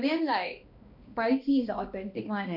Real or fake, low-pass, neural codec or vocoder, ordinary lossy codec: fake; 5.4 kHz; codec, 16 kHz, 1 kbps, X-Codec, HuBERT features, trained on balanced general audio; none